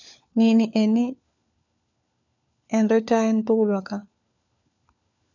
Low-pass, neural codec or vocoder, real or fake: 7.2 kHz; codec, 16 kHz, 4 kbps, FunCodec, trained on LibriTTS, 50 frames a second; fake